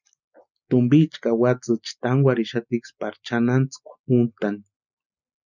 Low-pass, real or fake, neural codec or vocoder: 7.2 kHz; real; none